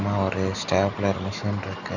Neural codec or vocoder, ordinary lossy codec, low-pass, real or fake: none; none; 7.2 kHz; real